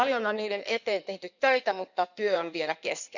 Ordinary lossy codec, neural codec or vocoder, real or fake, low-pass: none; codec, 16 kHz in and 24 kHz out, 1.1 kbps, FireRedTTS-2 codec; fake; 7.2 kHz